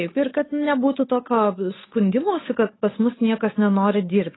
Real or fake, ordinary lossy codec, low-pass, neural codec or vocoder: fake; AAC, 16 kbps; 7.2 kHz; codec, 16 kHz, 8 kbps, FunCodec, trained on Chinese and English, 25 frames a second